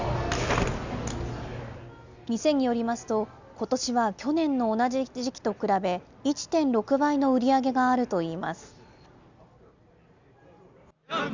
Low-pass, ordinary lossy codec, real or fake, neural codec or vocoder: 7.2 kHz; Opus, 64 kbps; real; none